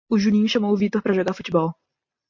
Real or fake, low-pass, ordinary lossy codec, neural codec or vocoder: real; 7.2 kHz; MP3, 48 kbps; none